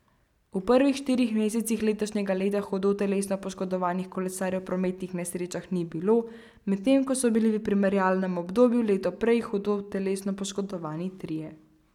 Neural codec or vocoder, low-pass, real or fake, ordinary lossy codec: none; 19.8 kHz; real; none